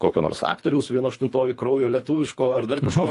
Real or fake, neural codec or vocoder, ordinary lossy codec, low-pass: fake; codec, 24 kHz, 3 kbps, HILCodec; AAC, 48 kbps; 10.8 kHz